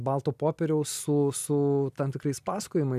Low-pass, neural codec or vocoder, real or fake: 14.4 kHz; none; real